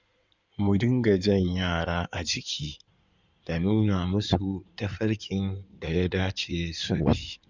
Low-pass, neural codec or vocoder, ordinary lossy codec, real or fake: 7.2 kHz; codec, 16 kHz in and 24 kHz out, 2.2 kbps, FireRedTTS-2 codec; Opus, 64 kbps; fake